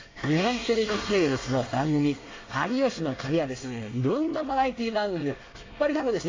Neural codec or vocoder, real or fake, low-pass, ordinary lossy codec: codec, 24 kHz, 1 kbps, SNAC; fake; 7.2 kHz; AAC, 32 kbps